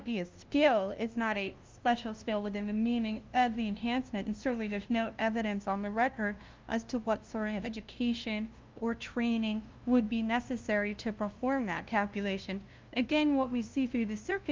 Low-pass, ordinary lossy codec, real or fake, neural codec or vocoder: 7.2 kHz; Opus, 24 kbps; fake; codec, 16 kHz, 0.5 kbps, FunCodec, trained on LibriTTS, 25 frames a second